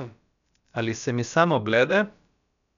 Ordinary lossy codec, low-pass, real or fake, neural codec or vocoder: none; 7.2 kHz; fake; codec, 16 kHz, about 1 kbps, DyCAST, with the encoder's durations